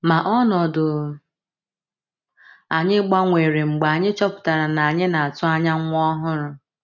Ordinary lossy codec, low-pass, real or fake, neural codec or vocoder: none; 7.2 kHz; real; none